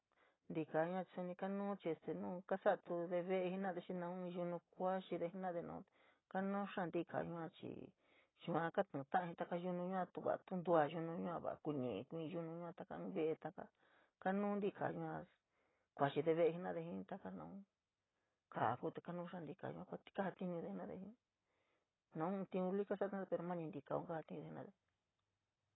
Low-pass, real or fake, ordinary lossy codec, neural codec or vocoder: 7.2 kHz; real; AAC, 16 kbps; none